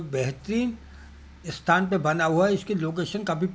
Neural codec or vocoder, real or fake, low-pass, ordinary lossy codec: none; real; none; none